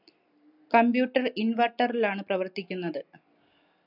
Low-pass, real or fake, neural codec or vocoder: 5.4 kHz; real; none